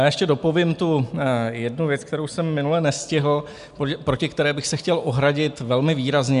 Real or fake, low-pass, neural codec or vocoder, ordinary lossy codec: real; 10.8 kHz; none; MP3, 96 kbps